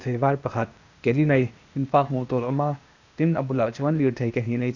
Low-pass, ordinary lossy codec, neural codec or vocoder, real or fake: 7.2 kHz; none; codec, 16 kHz, 0.8 kbps, ZipCodec; fake